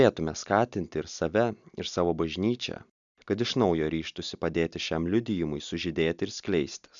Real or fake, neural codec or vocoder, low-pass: real; none; 7.2 kHz